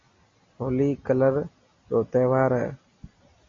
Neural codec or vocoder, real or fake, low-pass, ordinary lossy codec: none; real; 7.2 kHz; MP3, 32 kbps